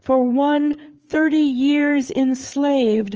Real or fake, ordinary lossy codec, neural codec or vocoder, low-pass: fake; Opus, 24 kbps; codec, 16 kHz, 4 kbps, FreqCodec, larger model; 7.2 kHz